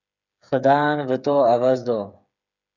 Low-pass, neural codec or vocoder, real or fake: 7.2 kHz; codec, 16 kHz, 8 kbps, FreqCodec, smaller model; fake